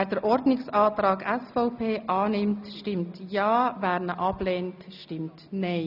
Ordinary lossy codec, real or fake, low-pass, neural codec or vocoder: none; real; 5.4 kHz; none